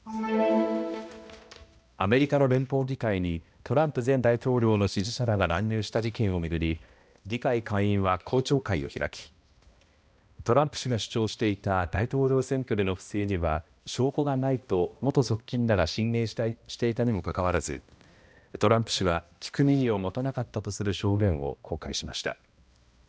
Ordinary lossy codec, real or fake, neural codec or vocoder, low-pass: none; fake; codec, 16 kHz, 1 kbps, X-Codec, HuBERT features, trained on balanced general audio; none